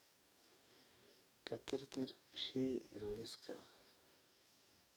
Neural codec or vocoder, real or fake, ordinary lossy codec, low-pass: codec, 44.1 kHz, 2.6 kbps, DAC; fake; none; none